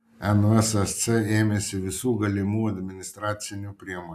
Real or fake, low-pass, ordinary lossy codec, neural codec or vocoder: real; 14.4 kHz; AAC, 96 kbps; none